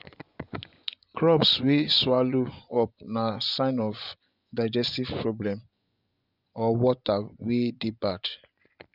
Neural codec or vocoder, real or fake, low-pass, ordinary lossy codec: vocoder, 24 kHz, 100 mel bands, Vocos; fake; 5.4 kHz; none